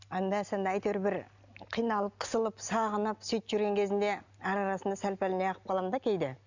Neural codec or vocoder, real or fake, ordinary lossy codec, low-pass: none; real; none; 7.2 kHz